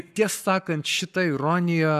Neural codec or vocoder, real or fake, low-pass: codec, 44.1 kHz, 7.8 kbps, Pupu-Codec; fake; 14.4 kHz